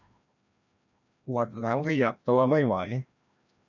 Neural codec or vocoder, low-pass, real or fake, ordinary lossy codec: codec, 16 kHz, 1 kbps, FreqCodec, larger model; 7.2 kHz; fake; none